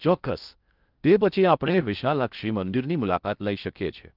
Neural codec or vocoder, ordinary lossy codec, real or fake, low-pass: codec, 16 kHz in and 24 kHz out, 0.6 kbps, FocalCodec, streaming, 4096 codes; Opus, 32 kbps; fake; 5.4 kHz